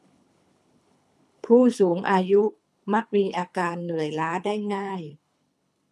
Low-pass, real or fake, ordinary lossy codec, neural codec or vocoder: none; fake; none; codec, 24 kHz, 3 kbps, HILCodec